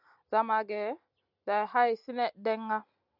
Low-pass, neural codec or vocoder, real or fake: 5.4 kHz; none; real